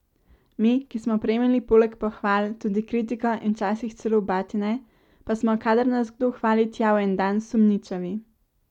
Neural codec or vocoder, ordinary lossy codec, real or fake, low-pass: none; none; real; 19.8 kHz